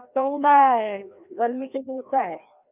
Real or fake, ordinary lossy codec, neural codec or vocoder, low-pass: fake; none; codec, 16 kHz, 1 kbps, FreqCodec, larger model; 3.6 kHz